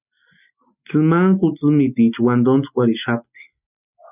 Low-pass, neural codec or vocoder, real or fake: 3.6 kHz; none; real